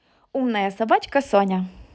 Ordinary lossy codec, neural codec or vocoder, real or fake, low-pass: none; none; real; none